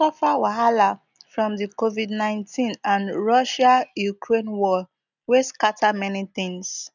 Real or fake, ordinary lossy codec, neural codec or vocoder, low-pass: real; none; none; 7.2 kHz